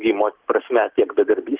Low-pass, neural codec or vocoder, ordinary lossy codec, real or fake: 3.6 kHz; none; Opus, 16 kbps; real